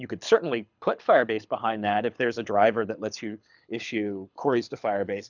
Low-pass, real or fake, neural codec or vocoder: 7.2 kHz; fake; codec, 24 kHz, 6 kbps, HILCodec